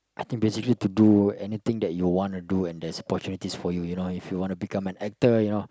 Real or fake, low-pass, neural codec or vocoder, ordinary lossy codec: real; none; none; none